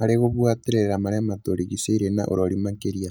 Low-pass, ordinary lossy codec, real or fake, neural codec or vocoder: none; none; real; none